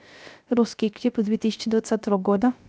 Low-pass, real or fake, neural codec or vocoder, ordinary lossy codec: none; fake; codec, 16 kHz, 0.3 kbps, FocalCodec; none